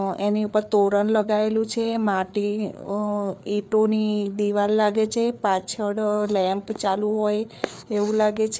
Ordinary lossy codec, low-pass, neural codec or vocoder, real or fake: none; none; codec, 16 kHz, 4 kbps, FreqCodec, larger model; fake